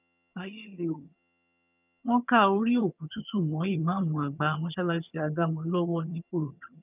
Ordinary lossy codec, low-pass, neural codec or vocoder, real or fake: none; 3.6 kHz; vocoder, 22.05 kHz, 80 mel bands, HiFi-GAN; fake